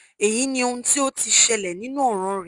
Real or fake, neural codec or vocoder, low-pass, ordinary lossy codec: real; none; 10.8 kHz; Opus, 32 kbps